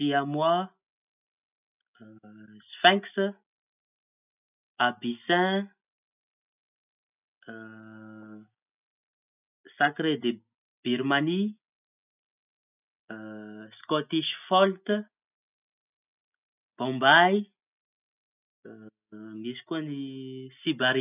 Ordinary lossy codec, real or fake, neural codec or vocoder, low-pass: none; real; none; 3.6 kHz